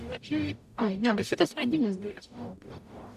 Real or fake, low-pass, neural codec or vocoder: fake; 14.4 kHz; codec, 44.1 kHz, 0.9 kbps, DAC